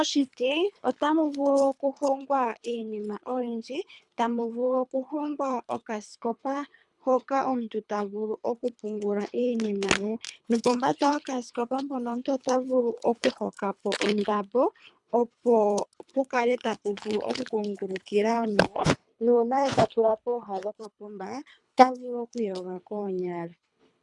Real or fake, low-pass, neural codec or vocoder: fake; 10.8 kHz; codec, 24 kHz, 3 kbps, HILCodec